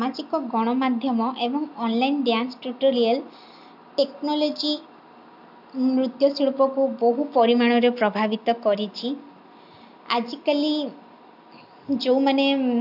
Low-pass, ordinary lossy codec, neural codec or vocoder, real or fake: 5.4 kHz; AAC, 48 kbps; none; real